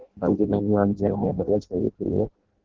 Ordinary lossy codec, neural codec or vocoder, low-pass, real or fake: Opus, 16 kbps; codec, 16 kHz in and 24 kHz out, 0.6 kbps, FireRedTTS-2 codec; 7.2 kHz; fake